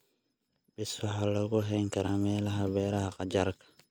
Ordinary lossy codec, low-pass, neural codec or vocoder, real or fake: none; none; none; real